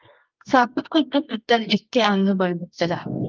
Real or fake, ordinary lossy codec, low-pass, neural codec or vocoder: fake; Opus, 24 kbps; 7.2 kHz; codec, 24 kHz, 0.9 kbps, WavTokenizer, medium music audio release